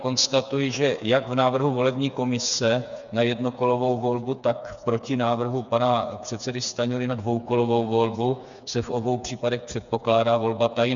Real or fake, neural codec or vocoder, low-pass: fake; codec, 16 kHz, 4 kbps, FreqCodec, smaller model; 7.2 kHz